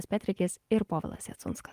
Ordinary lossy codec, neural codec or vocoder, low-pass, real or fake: Opus, 24 kbps; none; 14.4 kHz; real